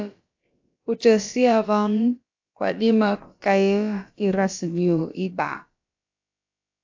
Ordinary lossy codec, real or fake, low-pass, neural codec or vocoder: AAC, 48 kbps; fake; 7.2 kHz; codec, 16 kHz, about 1 kbps, DyCAST, with the encoder's durations